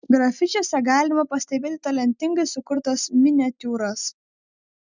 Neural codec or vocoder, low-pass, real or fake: none; 7.2 kHz; real